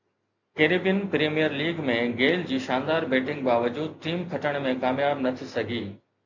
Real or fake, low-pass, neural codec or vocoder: real; 7.2 kHz; none